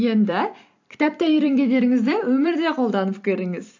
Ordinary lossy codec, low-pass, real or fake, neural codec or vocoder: AAC, 48 kbps; 7.2 kHz; fake; vocoder, 44.1 kHz, 128 mel bands every 256 samples, BigVGAN v2